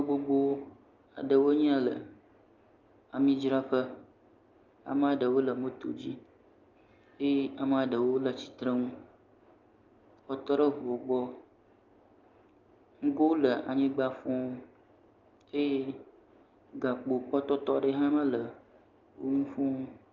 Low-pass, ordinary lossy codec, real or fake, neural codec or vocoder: 7.2 kHz; Opus, 24 kbps; real; none